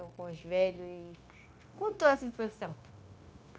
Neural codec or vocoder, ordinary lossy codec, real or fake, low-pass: codec, 16 kHz, 0.9 kbps, LongCat-Audio-Codec; none; fake; none